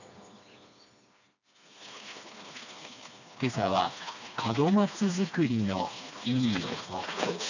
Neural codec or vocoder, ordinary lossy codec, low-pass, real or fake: codec, 16 kHz, 2 kbps, FreqCodec, smaller model; none; 7.2 kHz; fake